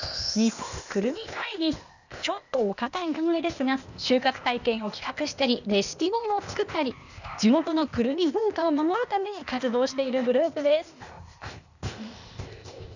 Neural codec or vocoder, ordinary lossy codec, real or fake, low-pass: codec, 16 kHz, 0.8 kbps, ZipCodec; none; fake; 7.2 kHz